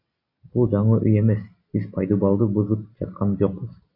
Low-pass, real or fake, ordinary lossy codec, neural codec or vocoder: 5.4 kHz; real; AAC, 48 kbps; none